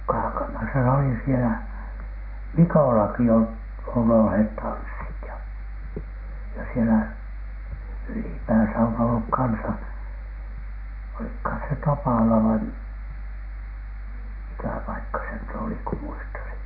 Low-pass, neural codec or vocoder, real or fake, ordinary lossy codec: 5.4 kHz; none; real; none